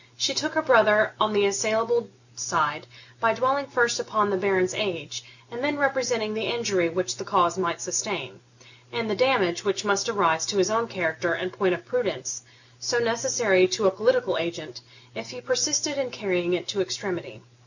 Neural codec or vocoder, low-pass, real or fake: none; 7.2 kHz; real